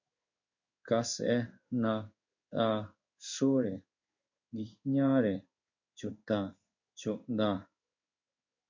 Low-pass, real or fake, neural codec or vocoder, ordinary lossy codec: 7.2 kHz; fake; codec, 16 kHz in and 24 kHz out, 1 kbps, XY-Tokenizer; MP3, 64 kbps